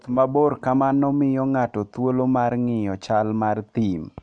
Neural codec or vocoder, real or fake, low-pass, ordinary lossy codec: none; real; 9.9 kHz; MP3, 64 kbps